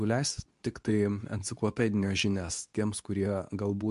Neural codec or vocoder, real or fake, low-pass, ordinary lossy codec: codec, 24 kHz, 0.9 kbps, WavTokenizer, medium speech release version 2; fake; 10.8 kHz; MP3, 64 kbps